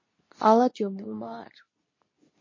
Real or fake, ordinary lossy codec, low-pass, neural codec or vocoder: fake; MP3, 32 kbps; 7.2 kHz; codec, 24 kHz, 0.9 kbps, WavTokenizer, medium speech release version 2